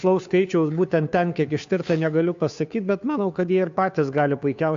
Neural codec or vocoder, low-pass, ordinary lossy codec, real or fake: codec, 16 kHz, 2 kbps, FunCodec, trained on Chinese and English, 25 frames a second; 7.2 kHz; AAC, 64 kbps; fake